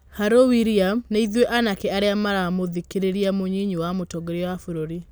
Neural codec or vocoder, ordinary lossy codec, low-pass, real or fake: none; none; none; real